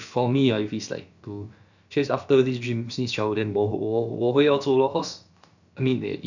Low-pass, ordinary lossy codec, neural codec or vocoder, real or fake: 7.2 kHz; none; codec, 16 kHz, about 1 kbps, DyCAST, with the encoder's durations; fake